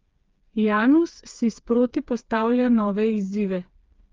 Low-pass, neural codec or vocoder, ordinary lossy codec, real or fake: 7.2 kHz; codec, 16 kHz, 4 kbps, FreqCodec, smaller model; Opus, 32 kbps; fake